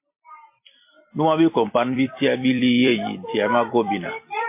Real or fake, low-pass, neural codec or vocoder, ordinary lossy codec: fake; 3.6 kHz; vocoder, 44.1 kHz, 128 mel bands every 256 samples, BigVGAN v2; MP3, 24 kbps